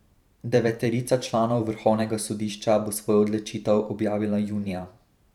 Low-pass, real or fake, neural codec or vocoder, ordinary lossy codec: 19.8 kHz; fake; vocoder, 44.1 kHz, 128 mel bands every 512 samples, BigVGAN v2; none